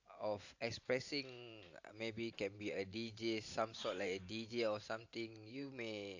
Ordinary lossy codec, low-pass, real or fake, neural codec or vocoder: AAC, 48 kbps; 7.2 kHz; fake; vocoder, 44.1 kHz, 128 mel bands every 512 samples, BigVGAN v2